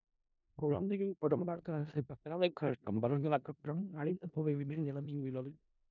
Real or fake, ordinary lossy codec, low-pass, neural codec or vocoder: fake; none; 7.2 kHz; codec, 16 kHz in and 24 kHz out, 0.4 kbps, LongCat-Audio-Codec, four codebook decoder